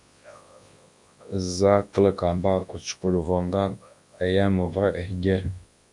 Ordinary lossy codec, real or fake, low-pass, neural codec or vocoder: AAC, 64 kbps; fake; 10.8 kHz; codec, 24 kHz, 0.9 kbps, WavTokenizer, large speech release